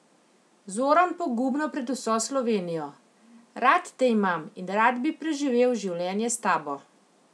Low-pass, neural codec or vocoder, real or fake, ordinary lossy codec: none; none; real; none